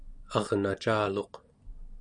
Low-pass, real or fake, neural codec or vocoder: 9.9 kHz; real; none